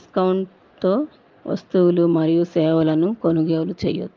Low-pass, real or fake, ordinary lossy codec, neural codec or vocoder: 7.2 kHz; real; Opus, 24 kbps; none